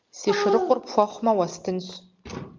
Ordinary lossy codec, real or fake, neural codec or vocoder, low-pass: Opus, 24 kbps; real; none; 7.2 kHz